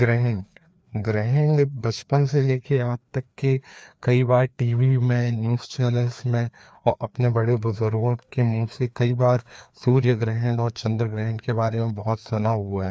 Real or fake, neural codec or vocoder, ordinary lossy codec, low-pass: fake; codec, 16 kHz, 2 kbps, FreqCodec, larger model; none; none